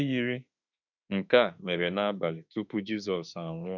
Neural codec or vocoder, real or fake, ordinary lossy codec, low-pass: autoencoder, 48 kHz, 32 numbers a frame, DAC-VAE, trained on Japanese speech; fake; none; 7.2 kHz